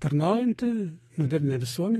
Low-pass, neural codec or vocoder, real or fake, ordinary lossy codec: 14.4 kHz; codec, 32 kHz, 1.9 kbps, SNAC; fake; AAC, 32 kbps